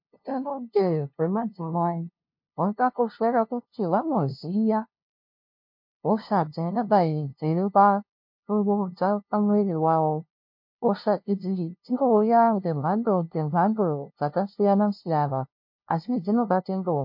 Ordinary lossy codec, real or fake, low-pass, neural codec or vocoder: MP3, 32 kbps; fake; 5.4 kHz; codec, 16 kHz, 0.5 kbps, FunCodec, trained on LibriTTS, 25 frames a second